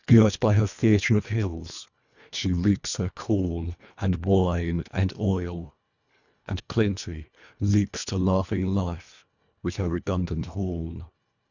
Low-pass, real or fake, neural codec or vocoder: 7.2 kHz; fake; codec, 24 kHz, 1.5 kbps, HILCodec